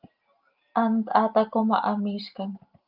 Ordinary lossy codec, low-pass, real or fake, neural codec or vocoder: Opus, 32 kbps; 5.4 kHz; real; none